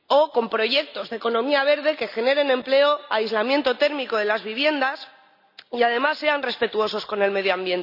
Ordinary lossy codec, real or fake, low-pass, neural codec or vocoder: none; real; 5.4 kHz; none